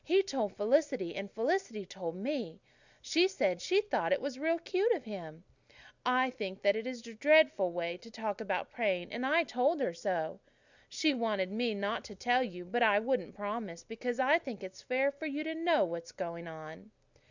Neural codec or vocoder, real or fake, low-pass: none; real; 7.2 kHz